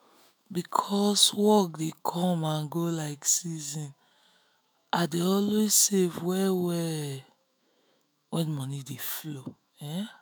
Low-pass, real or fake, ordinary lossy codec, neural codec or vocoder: none; fake; none; autoencoder, 48 kHz, 128 numbers a frame, DAC-VAE, trained on Japanese speech